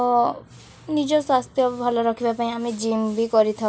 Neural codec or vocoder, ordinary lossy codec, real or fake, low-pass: none; none; real; none